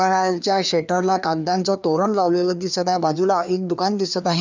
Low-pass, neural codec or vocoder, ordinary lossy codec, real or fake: 7.2 kHz; codec, 16 kHz, 2 kbps, FreqCodec, larger model; none; fake